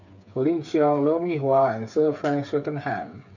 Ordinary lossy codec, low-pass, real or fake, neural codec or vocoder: none; 7.2 kHz; fake; codec, 16 kHz, 8 kbps, FreqCodec, smaller model